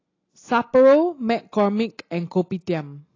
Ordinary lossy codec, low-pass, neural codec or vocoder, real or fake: AAC, 32 kbps; 7.2 kHz; none; real